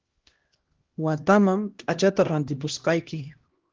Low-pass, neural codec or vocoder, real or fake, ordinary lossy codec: 7.2 kHz; codec, 16 kHz, 1 kbps, X-Codec, HuBERT features, trained on LibriSpeech; fake; Opus, 16 kbps